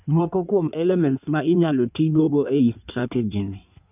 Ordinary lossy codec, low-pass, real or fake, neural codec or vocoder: none; 3.6 kHz; fake; codec, 16 kHz in and 24 kHz out, 1.1 kbps, FireRedTTS-2 codec